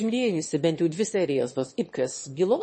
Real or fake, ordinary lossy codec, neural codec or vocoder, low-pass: fake; MP3, 32 kbps; autoencoder, 22.05 kHz, a latent of 192 numbers a frame, VITS, trained on one speaker; 9.9 kHz